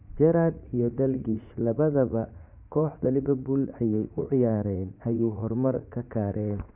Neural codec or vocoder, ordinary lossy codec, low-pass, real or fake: vocoder, 44.1 kHz, 80 mel bands, Vocos; none; 3.6 kHz; fake